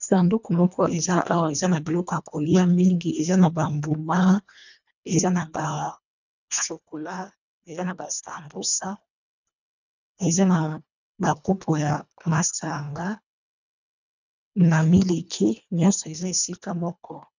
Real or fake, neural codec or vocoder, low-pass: fake; codec, 24 kHz, 1.5 kbps, HILCodec; 7.2 kHz